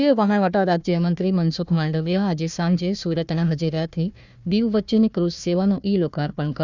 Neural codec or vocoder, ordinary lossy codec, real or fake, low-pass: codec, 16 kHz, 1 kbps, FunCodec, trained on Chinese and English, 50 frames a second; none; fake; 7.2 kHz